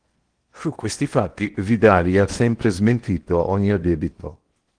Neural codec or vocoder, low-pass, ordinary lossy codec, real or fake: codec, 16 kHz in and 24 kHz out, 0.8 kbps, FocalCodec, streaming, 65536 codes; 9.9 kHz; Opus, 24 kbps; fake